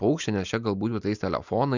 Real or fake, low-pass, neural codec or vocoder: real; 7.2 kHz; none